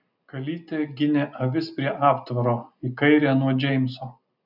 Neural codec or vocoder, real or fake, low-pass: none; real; 5.4 kHz